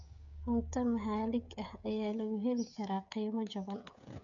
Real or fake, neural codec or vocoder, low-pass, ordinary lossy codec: fake; codec, 16 kHz, 16 kbps, FreqCodec, smaller model; 7.2 kHz; none